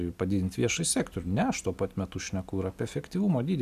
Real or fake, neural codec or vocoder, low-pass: real; none; 14.4 kHz